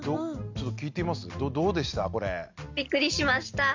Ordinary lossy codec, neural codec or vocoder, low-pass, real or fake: MP3, 64 kbps; none; 7.2 kHz; real